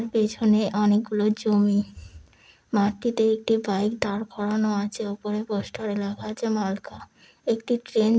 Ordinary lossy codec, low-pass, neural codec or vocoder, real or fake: none; none; none; real